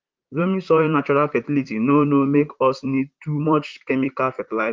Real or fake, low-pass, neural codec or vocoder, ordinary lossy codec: fake; 7.2 kHz; vocoder, 44.1 kHz, 128 mel bands, Pupu-Vocoder; Opus, 32 kbps